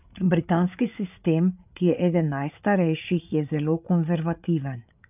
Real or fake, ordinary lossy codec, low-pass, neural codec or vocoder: fake; none; 3.6 kHz; codec, 16 kHz, 8 kbps, FreqCodec, larger model